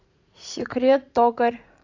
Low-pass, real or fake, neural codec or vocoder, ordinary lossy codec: 7.2 kHz; fake; vocoder, 44.1 kHz, 80 mel bands, Vocos; AAC, 48 kbps